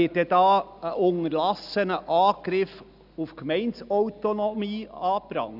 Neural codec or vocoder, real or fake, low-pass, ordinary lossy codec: none; real; 5.4 kHz; none